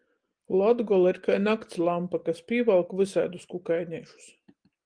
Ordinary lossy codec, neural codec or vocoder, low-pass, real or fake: Opus, 24 kbps; none; 9.9 kHz; real